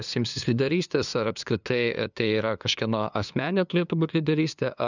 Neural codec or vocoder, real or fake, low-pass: codec, 16 kHz, 2 kbps, FunCodec, trained on LibriTTS, 25 frames a second; fake; 7.2 kHz